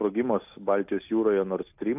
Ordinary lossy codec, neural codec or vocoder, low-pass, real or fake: AAC, 32 kbps; none; 3.6 kHz; real